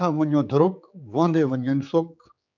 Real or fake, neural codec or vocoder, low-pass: fake; codec, 16 kHz, 4 kbps, X-Codec, HuBERT features, trained on general audio; 7.2 kHz